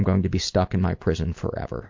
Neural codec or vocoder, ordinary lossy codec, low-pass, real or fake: none; MP3, 48 kbps; 7.2 kHz; real